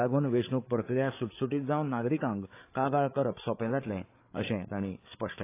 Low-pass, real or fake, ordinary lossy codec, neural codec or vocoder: 3.6 kHz; fake; AAC, 24 kbps; codec, 16 kHz, 8 kbps, FreqCodec, larger model